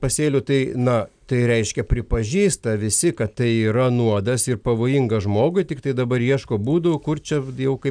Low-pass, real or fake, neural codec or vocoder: 9.9 kHz; real; none